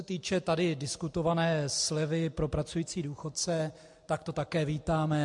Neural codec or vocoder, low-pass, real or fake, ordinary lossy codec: none; 10.8 kHz; real; MP3, 64 kbps